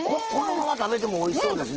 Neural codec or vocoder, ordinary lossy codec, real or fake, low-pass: none; Opus, 16 kbps; real; 7.2 kHz